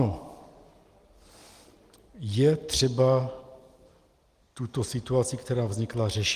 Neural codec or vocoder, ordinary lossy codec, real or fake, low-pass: none; Opus, 24 kbps; real; 14.4 kHz